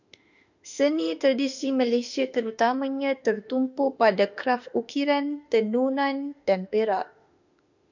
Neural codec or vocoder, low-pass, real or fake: autoencoder, 48 kHz, 32 numbers a frame, DAC-VAE, trained on Japanese speech; 7.2 kHz; fake